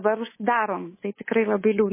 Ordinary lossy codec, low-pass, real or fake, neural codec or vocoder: MP3, 16 kbps; 3.6 kHz; fake; codec, 24 kHz, 3.1 kbps, DualCodec